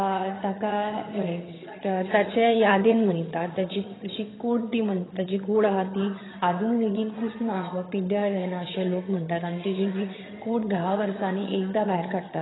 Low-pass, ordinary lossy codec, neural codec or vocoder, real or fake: 7.2 kHz; AAC, 16 kbps; vocoder, 22.05 kHz, 80 mel bands, HiFi-GAN; fake